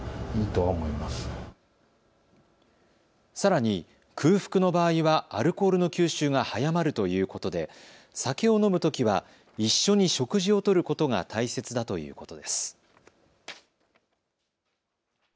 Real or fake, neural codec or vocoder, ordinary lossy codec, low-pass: real; none; none; none